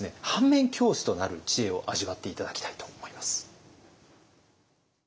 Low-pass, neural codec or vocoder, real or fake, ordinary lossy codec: none; none; real; none